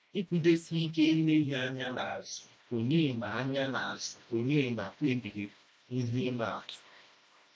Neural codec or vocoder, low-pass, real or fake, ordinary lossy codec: codec, 16 kHz, 1 kbps, FreqCodec, smaller model; none; fake; none